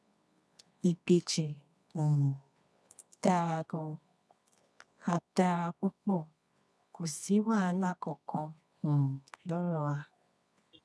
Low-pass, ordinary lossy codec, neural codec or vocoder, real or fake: none; none; codec, 24 kHz, 0.9 kbps, WavTokenizer, medium music audio release; fake